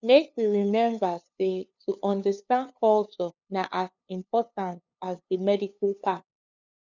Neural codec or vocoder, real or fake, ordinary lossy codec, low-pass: codec, 16 kHz, 2 kbps, FunCodec, trained on LibriTTS, 25 frames a second; fake; none; 7.2 kHz